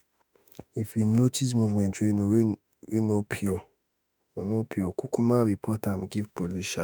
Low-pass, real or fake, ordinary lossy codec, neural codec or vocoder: none; fake; none; autoencoder, 48 kHz, 32 numbers a frame, DAC-VAE, trained on Japanese speech